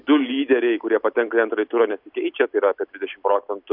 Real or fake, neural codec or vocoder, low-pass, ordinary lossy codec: real; none; 5.4 kHz; MP3, 48 kbps